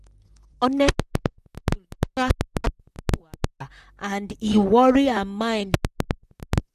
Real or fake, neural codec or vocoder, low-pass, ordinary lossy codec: fake; vocoder, 44.1 kHz, 128 mel bands, Pupu-Vocoder; 14.4 kHz; none